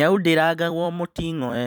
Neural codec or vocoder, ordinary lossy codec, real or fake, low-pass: vocoder, 44.1 kHz, 128 mel bands every 256 samples, BigVGAN v2; none; fake; none